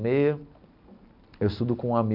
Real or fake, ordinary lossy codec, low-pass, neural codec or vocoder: real; AAC, 48 kbps; 5.4 kHz; none